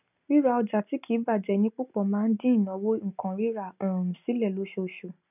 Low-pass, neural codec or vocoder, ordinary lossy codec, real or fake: 3.6 kHz; none; none; real